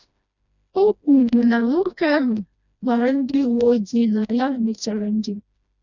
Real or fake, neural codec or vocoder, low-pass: fake; codec, 16 kHz, 1 kbps, FreqCodec, smaller model; 7.2 kHz